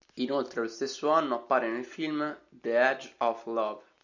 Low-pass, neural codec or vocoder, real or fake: 7.2 kHz; none; real